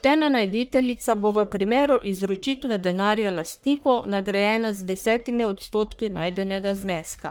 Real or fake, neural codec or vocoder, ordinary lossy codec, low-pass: fake; codec, 44.1 kHz, 1.7 kbps, Pupu-Codec; none; none